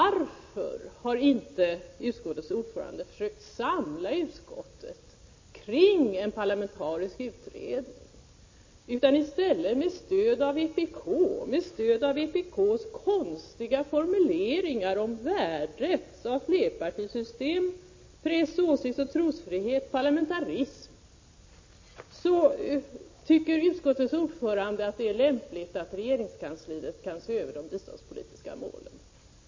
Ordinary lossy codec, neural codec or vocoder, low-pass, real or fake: MP3, 32 kbps; none; 7.2 kHz; real